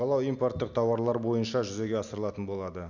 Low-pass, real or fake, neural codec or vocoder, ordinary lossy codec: 7.2 kHz; real; none; none